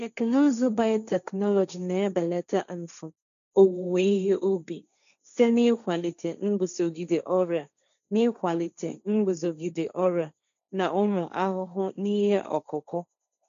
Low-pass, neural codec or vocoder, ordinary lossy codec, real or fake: 7.2 kHz; codec, 16 kHz, 1.1 kbps, Voila-Tokenizer; none; fake